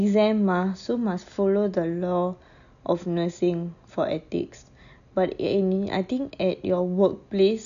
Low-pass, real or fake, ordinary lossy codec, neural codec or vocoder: 7.2 kHz; real; none; none